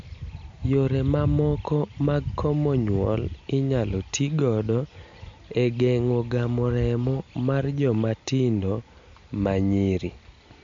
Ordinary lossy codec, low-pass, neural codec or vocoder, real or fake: MP3, 48 kbps; 7.2 kHz; none; real